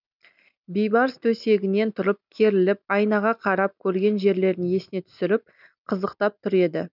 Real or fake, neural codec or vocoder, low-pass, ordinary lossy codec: real; none; 5.4 kHz; none